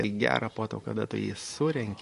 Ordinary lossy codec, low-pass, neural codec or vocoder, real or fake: MP3, 48 kbps; 14.4 kHz; vocoder, 44.1 kHz, 128 mel bands every 512 samples, BigVGAN v2; fake